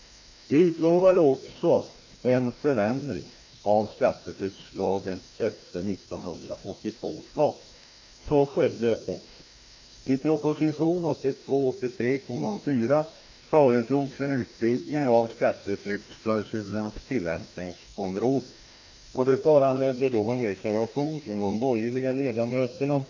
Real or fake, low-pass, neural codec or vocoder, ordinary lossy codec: fake; 7.2 kHz; codec, 16 kHz, 1 kbps, FreqCodec, larger model; MP3, 48 kbps